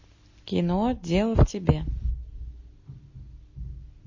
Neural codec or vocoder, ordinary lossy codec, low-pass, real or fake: none; MP3, 32 kbps; 7.2 kHz; real